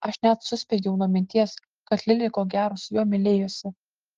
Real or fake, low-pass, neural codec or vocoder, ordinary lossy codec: real; 7.2 kHz; none; Opus, 16 kbps